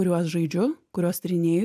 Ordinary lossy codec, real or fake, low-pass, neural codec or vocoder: AAC, 96 kbps; real; 14.4 kHz; none